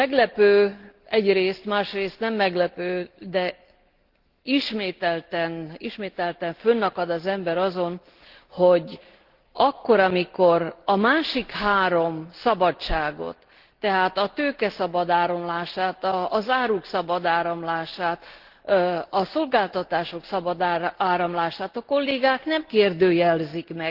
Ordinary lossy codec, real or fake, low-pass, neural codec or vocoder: Opus, 16 kbps; real; 5.4 kHz; none